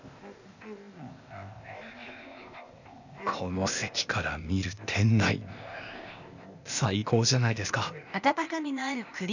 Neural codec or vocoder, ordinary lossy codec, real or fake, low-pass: codec, 16 kHz, 0.8 kbps, ZipCodec; none; fake; 7.2 kHz